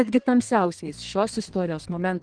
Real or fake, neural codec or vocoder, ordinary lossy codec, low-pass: fake; codec, 32 kHz, 1.9 kbps, SNAC; Opus, 16 kbps; 9.9 kHz